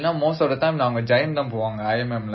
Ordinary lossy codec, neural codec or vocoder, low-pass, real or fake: MP3, 24 kbps; none; 7.2 kHz; real